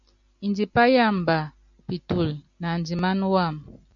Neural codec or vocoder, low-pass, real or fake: none; 7.2 kHz; real